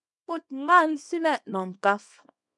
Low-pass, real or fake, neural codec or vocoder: 10.8 kHz; fake; codec, 24 kHz, 0.9 kbps, WavTokenizer, small release